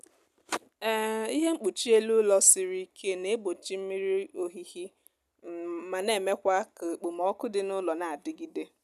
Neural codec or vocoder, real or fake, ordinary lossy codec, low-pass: none; real; none; 14.4 kHz